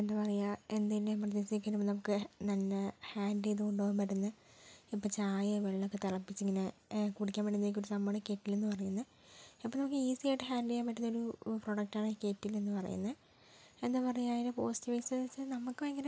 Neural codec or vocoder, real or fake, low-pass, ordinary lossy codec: none; real; none; none